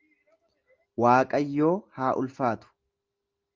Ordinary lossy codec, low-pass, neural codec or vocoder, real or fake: Opus, 32 kbps; 7.2 kHz; none; real